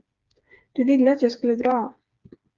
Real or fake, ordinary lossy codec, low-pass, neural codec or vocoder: fake; Opus, 32 kbps; 7.2 kHz; codec, 16 kHz, 4 kbps, FreqCodec, smaller model